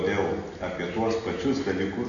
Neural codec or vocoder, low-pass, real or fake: none; 7.2 kHz; real